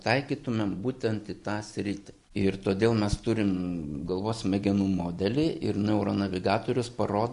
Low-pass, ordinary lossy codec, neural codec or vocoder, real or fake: 10.8 kHz; MP3, 96 kbps; none; real